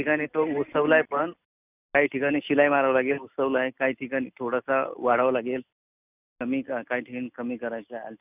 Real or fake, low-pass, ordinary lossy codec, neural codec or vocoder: real; 3.6 kHz; none; none